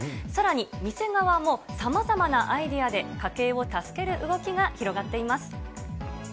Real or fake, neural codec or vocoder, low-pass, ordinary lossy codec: real; none; none; none